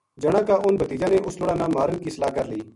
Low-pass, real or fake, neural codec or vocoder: 10.8 kHz; real; none